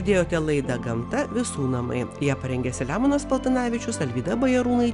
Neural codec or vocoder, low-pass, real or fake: none; 10.8 kHz; real